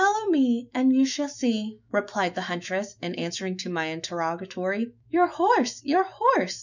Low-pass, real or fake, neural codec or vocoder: 7.2 kHz; fake; autoencoder, 48 kHz, 128 numbers a frame, DAC-VAE, trained on Japanese speech